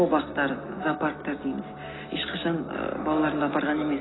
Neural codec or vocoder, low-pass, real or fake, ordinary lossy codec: none; 7.2 kHz; real; AAC, 16 kbps